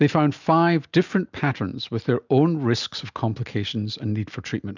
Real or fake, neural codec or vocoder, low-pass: real; none; 7.2 kHz